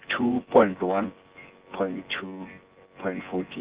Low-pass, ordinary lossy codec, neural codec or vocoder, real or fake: 3.6 kHz; Opus, 16 kbps; vocoder, 24 kHz, 100 mel bands, Vocos; fake